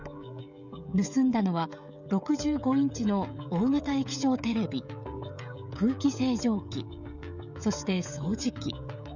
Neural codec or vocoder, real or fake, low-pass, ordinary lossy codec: codec, 16 kHz, 16 kbps, FreqCodec, smaller model; fake; 7.2 kHz; none